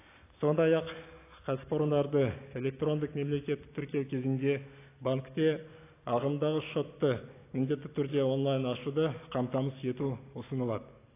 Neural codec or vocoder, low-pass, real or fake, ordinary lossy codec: none; 3.6 kHz; real; AAC, 24 kbps